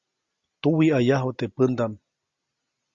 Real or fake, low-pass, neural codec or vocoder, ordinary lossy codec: real; 7.2 kHz; none; Opus, 64 kbps